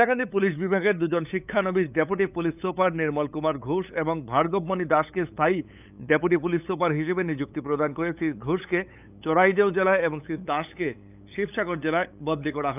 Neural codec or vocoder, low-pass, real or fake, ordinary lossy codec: codec, 16 kHz, 8 kbps, FunCodec, trained on LibriTTS, 25 frames a second; 3.6 kHz; fake; none